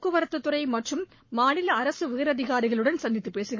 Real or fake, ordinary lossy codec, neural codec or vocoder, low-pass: fake; MP3, 32 kbps; codec, 44.1 kHz, 7.8 kbps, Pupu-Codec; 7.2 kHz